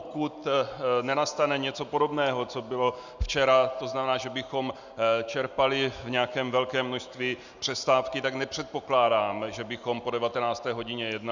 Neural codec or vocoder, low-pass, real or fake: none; 7.2 kHz; real